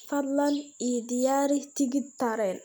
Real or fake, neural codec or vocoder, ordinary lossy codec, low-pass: real; none; none; none